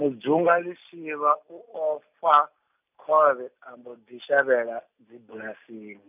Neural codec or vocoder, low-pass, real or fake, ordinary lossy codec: none; 3.6 kHz; real; none